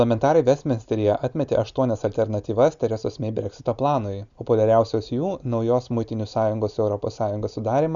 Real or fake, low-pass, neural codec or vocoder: real; 7.2 kHz; none